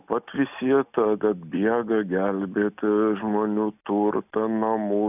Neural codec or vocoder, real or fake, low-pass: none; real; 3.6 kHz